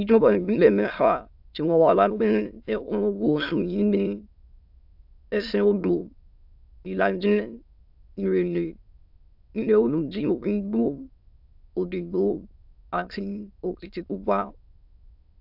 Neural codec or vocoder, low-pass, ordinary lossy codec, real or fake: autoencoder, 22.05 kHz, a latent of 192 numbers a frame, VITS, trained on many speakers; 5.4 kHz; AAC, 48 kbps; fake